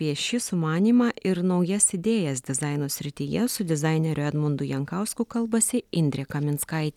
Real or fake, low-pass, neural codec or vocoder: real; 19.8 kHz; none